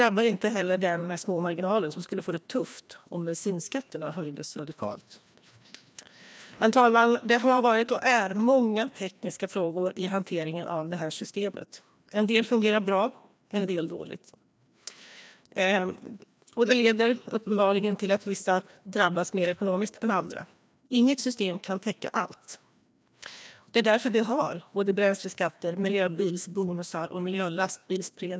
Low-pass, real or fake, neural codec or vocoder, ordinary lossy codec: none; fake; codec, 16 kHz, 1 kbps, FreqCodec, larger model; none